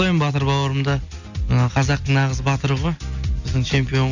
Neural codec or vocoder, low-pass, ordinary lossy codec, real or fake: none; 7.2 kHz; none; real